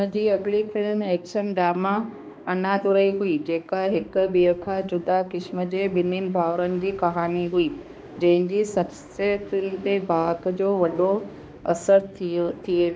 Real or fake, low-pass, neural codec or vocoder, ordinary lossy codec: fake; none; codec, 16 kHz, 2 kbps, X-Codec, HuBERT features, trained on balanced general audio; none